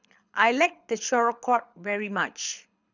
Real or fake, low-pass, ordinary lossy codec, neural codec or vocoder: fake; 7.2 kHz; none; codec, 24 kHz, 6 kbps, HILCodec